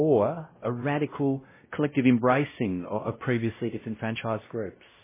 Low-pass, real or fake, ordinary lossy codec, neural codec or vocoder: 3.6 kHz; fake; MP3, 16 kbps; codec, 16 kHz, 0.5 kbps, X-Codec, WavLM features, trained on Multilingual LibriSpeech